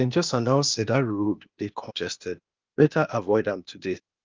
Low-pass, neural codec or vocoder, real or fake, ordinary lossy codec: 7.2 kHz; codec, 16 kHz, 0.8 kbps, ZipCodec; fake; Opus, 24 kbps